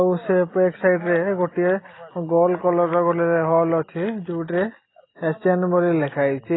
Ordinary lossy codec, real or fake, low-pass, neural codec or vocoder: AAC, 16 kbps; real; 7.2 kHz; none